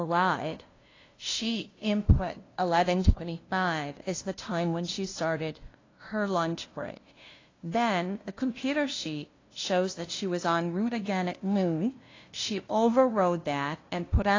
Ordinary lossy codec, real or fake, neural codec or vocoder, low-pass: AAC, 32 kbps; fake; codec, 16 kHz, 0.5 kbps, FunCodec, trained on LibriTTS, 25 frames a second; 7.2 kHz